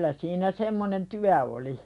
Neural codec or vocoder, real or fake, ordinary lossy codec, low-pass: none; real; none; 10.8 kHz